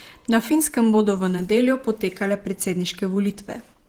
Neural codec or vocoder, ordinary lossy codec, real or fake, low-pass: vocoder, 48 kHz, 128 mel bands, Vocos; Opus, 24 kbps; fake; 19.8 kHz